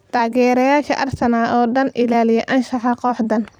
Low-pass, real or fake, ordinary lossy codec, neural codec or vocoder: 19.8 kHz; fake; none; vocoder, 44.1 kHz, 128 mel bands every 256 samples, BigVGAN v2